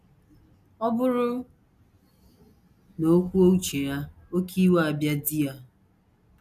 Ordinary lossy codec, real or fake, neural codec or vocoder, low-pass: none; real; none; 14.4 kHz